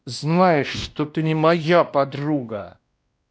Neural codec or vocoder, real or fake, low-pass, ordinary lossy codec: codec, 16 kHz, 1 kbps, X-Codec, WavLM features, trained on Multilingual LibriSpeech; fake; none; none